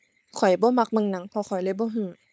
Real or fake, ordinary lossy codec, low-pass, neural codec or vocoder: fake; none; none; codec, 16 kHz, 4.8 kbps, FACodec